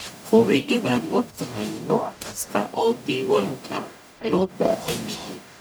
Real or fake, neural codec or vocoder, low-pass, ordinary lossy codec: fake; codec, 44.1 kHz, 0.9 kbps, DAC; none; none